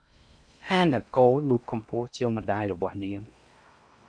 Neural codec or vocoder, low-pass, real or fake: codec, 16 kHz in and 24 kHz out, 0.6 kbps, FocalCodec, streaming, 4096 codes; 9.9 kHz; fake